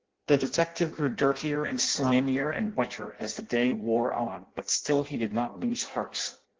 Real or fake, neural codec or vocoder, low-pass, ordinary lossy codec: fake; codec, 16 kHz in and 24 kHz out, 0.6 kbps, FireRedTTS-2 codec; 7.2 kHz; Opus, 16 kbps